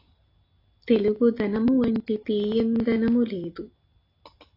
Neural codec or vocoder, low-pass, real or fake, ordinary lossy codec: none; 5.4 kHz; real; AAC, 32 kbps